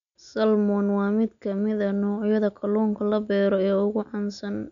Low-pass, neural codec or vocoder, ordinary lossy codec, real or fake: 7.2 kHz; none; none; real